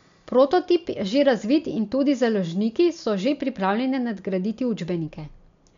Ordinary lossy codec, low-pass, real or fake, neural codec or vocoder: MP3, 64 kbps; 7.2 kHz; real; none